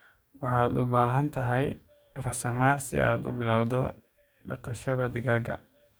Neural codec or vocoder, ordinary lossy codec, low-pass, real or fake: codec, 44.1 kHz, 2.6 kbps, DAC; none; none; fake